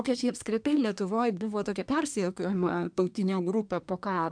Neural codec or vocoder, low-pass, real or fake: codec, 24 kHz, 1 kbps, SNAC; 9.9 kHz; fake